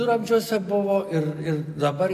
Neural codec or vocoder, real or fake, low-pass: none; real; 14.4 kHz